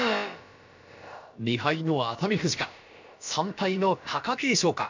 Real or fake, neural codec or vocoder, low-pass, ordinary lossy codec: fake; codec, 16 kHz, about 1 kbps, DyCAST, with the encoder's durations; 7.2 kHz; MP3, 64 kbps